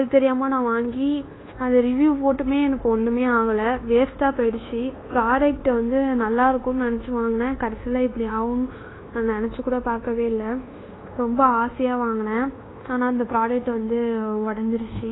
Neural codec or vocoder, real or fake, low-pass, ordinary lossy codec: codec, 24 kHz, 1.2 kbps, DualCodec; fake; 7.2 kHz; AAC, 16 kbps